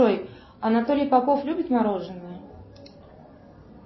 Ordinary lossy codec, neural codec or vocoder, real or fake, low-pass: MP3, 24 kbps; none; real; 7.2 kHz